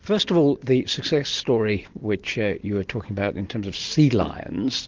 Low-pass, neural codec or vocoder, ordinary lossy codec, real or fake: 7.2 kHz; none; Opus, 16 kbps; real